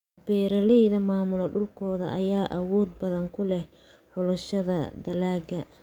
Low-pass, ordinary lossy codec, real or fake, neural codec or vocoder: 19.8 kHz; none; fake; codec, 44.1 kHz, 7.8 kbps, DAC